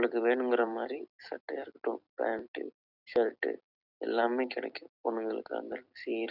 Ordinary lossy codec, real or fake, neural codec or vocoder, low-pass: none; fake; vocoder, 44.1 kHz, 128 mel bands every 256 samples, BigVGAN v2; 5.4 kHz